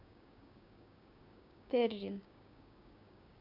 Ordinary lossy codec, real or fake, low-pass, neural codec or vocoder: none; fake; 5.4 kHz; codec, 16 kHz, 6 kbps, DAC